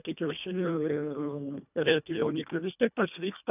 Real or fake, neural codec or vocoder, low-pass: fake; codec, 24 kHz, 1.5 kbps, HILCodec; 3.6 kHz